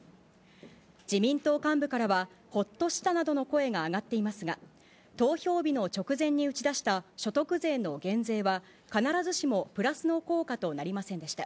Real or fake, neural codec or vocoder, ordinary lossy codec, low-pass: real; none; none; none